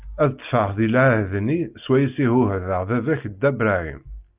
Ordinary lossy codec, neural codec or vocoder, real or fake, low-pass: Opus, 24 kbps; none; real; 3.6 kHz